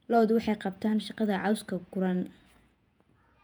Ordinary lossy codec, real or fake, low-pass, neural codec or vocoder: none; fake; 19.8 kHz; vocoder, 48 kHz, 128 mel bands, Vocos